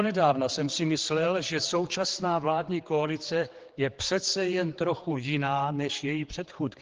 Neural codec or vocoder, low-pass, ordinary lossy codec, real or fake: codec, 16 kHz, 4 kbps, X-Codec, HuBERT features, trained on general audio; 7.2 kHz; Opus, 16 kbps; fake